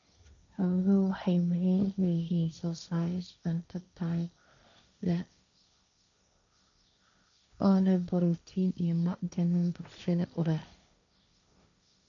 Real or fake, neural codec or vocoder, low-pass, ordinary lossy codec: fake; codec, 16 kHz, 1.1 kbps, Voila-Tokenizer; 7.2 kHz; none